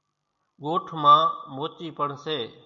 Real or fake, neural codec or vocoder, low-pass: real; none; 7.2 kHz